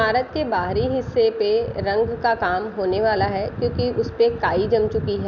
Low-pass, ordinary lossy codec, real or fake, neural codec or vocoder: 7.2 kHz; none; real; none